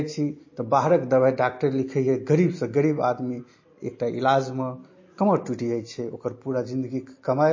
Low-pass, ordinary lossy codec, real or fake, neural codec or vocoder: 7.2 kHz; MP3, 32 kbps; real; none